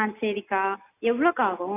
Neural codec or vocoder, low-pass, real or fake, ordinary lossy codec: none; 3.6 kHz; real; AAC, 24 kbps